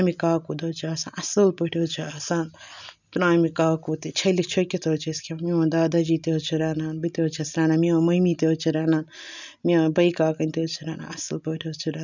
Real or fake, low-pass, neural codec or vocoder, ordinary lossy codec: real; 7.2 kHz; none; none